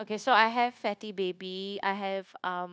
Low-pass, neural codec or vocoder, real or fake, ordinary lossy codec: none; codec, 16 kHz, 0.9 kbps, LongCat-Audio-Codec; fake; none